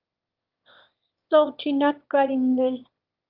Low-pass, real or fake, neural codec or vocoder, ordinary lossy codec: 5.4 kHz; fake; autoencoder, 22.05 kHz, a latent of 192 numbers a frame, VITS, trained on one speaker; Opus, 32 kbps